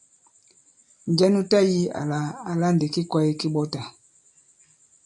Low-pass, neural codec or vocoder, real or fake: 10.8 kHz; none; real